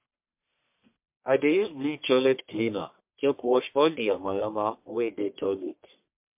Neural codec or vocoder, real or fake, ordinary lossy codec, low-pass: codec, 44.1 kHz, 1.7 kbps, Pupu-Codec; fake; MP3, 32 kbps; 3.6 kHz